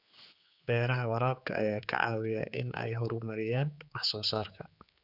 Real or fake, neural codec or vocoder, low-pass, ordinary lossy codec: fake; codec, 16 kHz, 4 kbps, X-Codec, HuBERT features, trained on general audio; 5.4 kHz; none